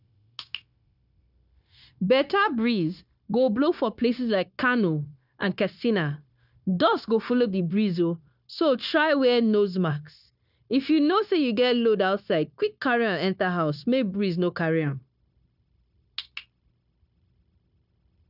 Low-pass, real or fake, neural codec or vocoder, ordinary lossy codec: 5.4 kHz; fake; codec, 16 kHz, 0.9 kbps, LongCat-Audio-Codec; none